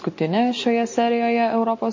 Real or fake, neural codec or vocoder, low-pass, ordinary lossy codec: real; none; 7.2 kHz; MP3, 32 kbps